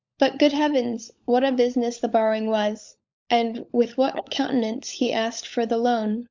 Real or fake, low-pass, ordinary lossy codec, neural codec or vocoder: fake; 7.2 kHz; MP3, 64 kbps; codec, 16 kHz, 16 kbps, FunCodec, trained on LibriTTS, 50 frames a second